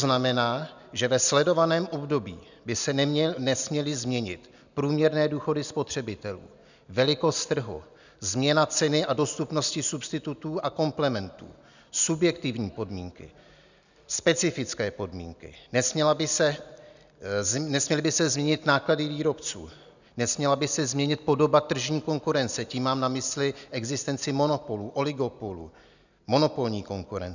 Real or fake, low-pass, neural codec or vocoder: real; 7.2 kHz; none